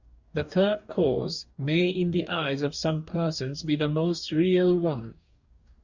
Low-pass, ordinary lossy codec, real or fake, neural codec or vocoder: 7.2 kHz; Opus, 64 kbps; fake; codec, 44.1 kHz, 2.6 kbps, DAC